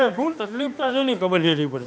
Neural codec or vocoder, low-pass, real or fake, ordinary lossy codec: codec, 16 kHz, 2 kbps, X-Codec, HuBERT features, trained on balanced general audio; none; fake; none